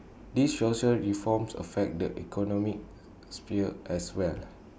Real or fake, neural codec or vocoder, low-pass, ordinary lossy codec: real; none; none; none